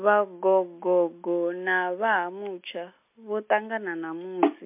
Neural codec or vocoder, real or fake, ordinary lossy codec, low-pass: none; real; MP3, 32 kbps; 3.6 kHz